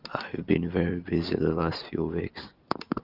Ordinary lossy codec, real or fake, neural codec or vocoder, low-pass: Opus, 24 kbps; real; none; 5.4 kHz